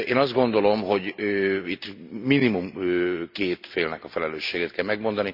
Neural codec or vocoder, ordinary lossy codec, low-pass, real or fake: none; none; 5.4 kHz; real